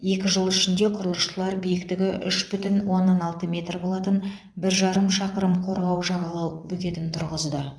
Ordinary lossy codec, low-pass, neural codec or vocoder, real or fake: none; none; vocoder, 22.05 kHz, 80 mel bands, WaveNeXt; fake